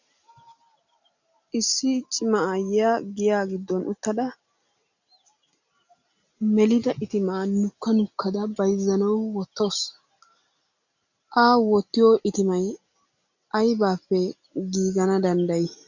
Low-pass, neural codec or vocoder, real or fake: 7.2 kHz; none; real